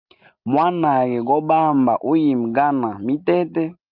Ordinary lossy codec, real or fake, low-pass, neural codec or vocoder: Opus, 24 kbps; real; 5.4 kHz; none